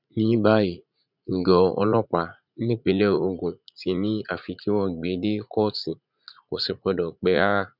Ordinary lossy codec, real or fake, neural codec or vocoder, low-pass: none; fake; vocoder, 44.1 kHz, 80 mel bands, Vocos; 5.4 kHz